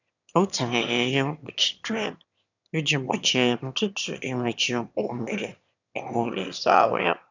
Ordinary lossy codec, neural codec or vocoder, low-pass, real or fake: none; autoencoder, 22.05 kHz, a latent of 192 numbers a frame, VITS, trained on one speaker; 7.2 kHz; fake